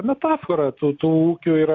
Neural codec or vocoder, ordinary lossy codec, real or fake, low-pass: none; MP3, 48 kbps; real; 7.2 kHz